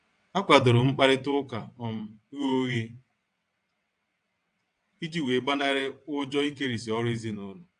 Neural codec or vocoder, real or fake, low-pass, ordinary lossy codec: vocoder, 22.05 kHz, 80 mel bands, WaveNeXt; fake; 9.9 kHz; AAC, 64 kbps